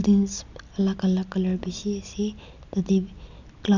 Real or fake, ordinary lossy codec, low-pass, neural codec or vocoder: real; none; 7.2 kHz; none